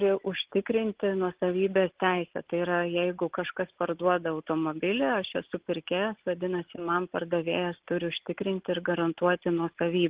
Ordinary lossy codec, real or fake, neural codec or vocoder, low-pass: Opus, 16 kbps; real; none; 3.6 kHz